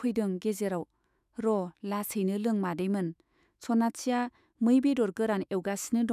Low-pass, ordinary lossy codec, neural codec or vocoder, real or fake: 14.4 kHz; none; autoencoder, 48 kHz, 128 numbers a frame, DAC-VAE, trained on Japanese speech; fake